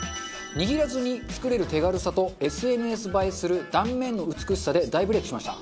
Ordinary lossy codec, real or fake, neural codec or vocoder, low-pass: none; real; none; none